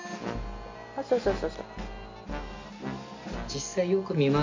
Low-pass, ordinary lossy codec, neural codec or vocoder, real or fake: 7.2 kHz; MP3, 64 kbps; none; real